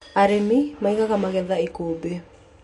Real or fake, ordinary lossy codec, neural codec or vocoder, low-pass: real; MP3, 48 kbps; none; 14.4 kHz